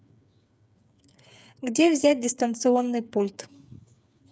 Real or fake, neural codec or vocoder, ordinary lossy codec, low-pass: fake; codec, 16 kHz, 8 kbps, FreqCodec, smaller model; none; none